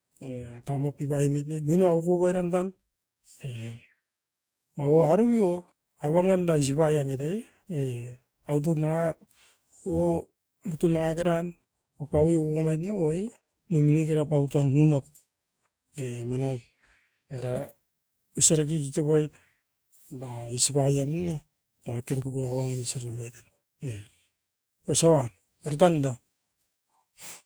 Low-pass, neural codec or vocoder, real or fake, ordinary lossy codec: none; codec, 44.1 kHz, 2.6 kbps, DAC; fake; none